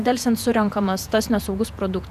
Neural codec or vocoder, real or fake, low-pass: vocoder, 48 kHz, 128 mel bands, Vocos; fake; 14.4 kHz